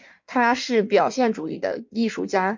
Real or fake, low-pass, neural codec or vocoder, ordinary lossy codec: fake; 7.2 kHz; codec, 16 kHz in and 24 kHz out, 1.1 kbps, FireRedTTS-2 codec; MP3, 48 kbps